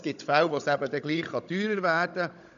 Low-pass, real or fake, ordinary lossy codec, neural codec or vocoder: 7.2 kHz; fake; none; codec, 16 kHz, 16 kbps, FunCodec, trained on Chinese and English, 50 frames a second